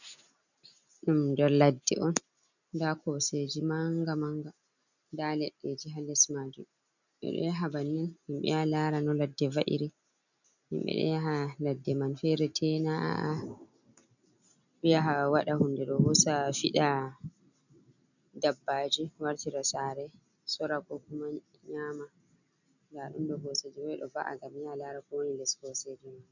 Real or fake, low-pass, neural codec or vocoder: real; 7.2 kHz; none